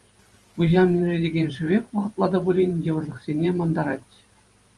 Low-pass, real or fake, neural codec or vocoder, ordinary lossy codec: 10.8 kHz; fake; vocoder, 44.1 kHz, 128 mel bands every 512 samples, BigVGAN v2; Opus, 32 kbps